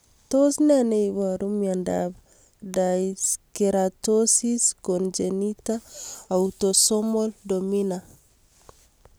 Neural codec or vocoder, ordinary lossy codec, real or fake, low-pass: none; none; real; none